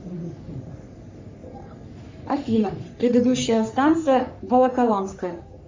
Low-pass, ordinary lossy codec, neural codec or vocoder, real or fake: 7.2 kHz; MP3, 64 kbps; codec, 44.1 kHz, 3.4 kbps, Pupu-Codec; fake